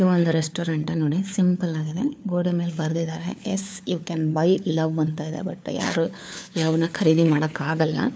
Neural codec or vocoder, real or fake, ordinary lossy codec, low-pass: codec, 16 kHz, 4 kbps, FunCodec, trained on LibriTTS, 50 frames a second; fake; none; none